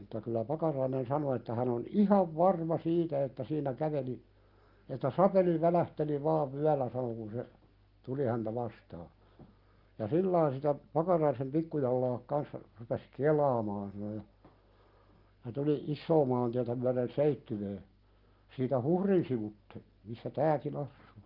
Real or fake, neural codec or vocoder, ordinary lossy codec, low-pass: real; none; Opus, 32 kbps; 5.4 kHz